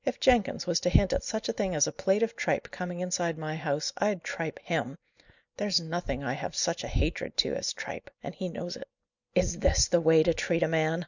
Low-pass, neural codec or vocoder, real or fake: 7.2 kHz; none; real